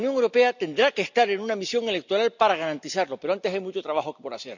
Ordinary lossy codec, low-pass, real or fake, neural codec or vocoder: none; 7.2 kHz; real; none